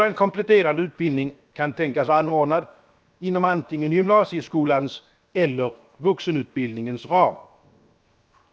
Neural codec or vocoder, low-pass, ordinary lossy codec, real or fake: codec, 16 kHz, 0.7 kbps, FocalCodec; none; none; fake